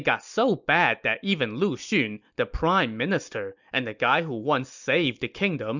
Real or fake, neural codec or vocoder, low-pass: real; none; 7.2 kHz